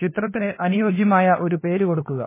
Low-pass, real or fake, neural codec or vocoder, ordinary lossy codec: 3.6 kHz; fake; codec, 16 kHz, 2 kbps, FunCodec, trained on LibriTTS, 25 frames a second; MP3, 16 kbps